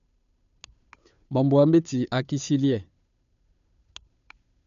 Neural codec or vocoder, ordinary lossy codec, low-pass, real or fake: codec, 16 kHz, 2 kbps, FunCodec, trained on Chinese and English, 25 frames a second; none; 7.2 kHz; fake